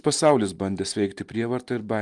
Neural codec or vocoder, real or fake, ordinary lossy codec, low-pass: none; real; Opus, 32 kbps; 10.8 kHz